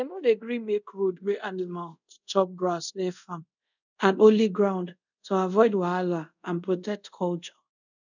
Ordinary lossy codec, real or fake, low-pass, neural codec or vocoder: none; fake; 7.2 kHz; codec, 24 kHz, 0.5 kbps, DualCodec